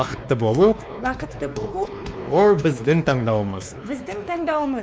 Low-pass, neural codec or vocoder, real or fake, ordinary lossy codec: none; codec, 16 kHz, 2 kbps, X-Codec, WavLM features, trained on Multilingual LibriSpeech; fake; none